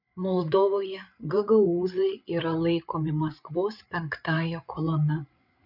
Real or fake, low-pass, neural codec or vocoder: fake; 5.4 kHz; codec, 16 kHz, 8 kbps, FreqCodec, larger model